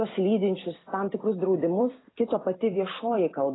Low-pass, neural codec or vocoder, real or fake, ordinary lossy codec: 7.2 kHz; vocoder, 44.1 kHz, 128 mel bands every 256 samples, BigVGAN v2; fake; AAC, 16 kbps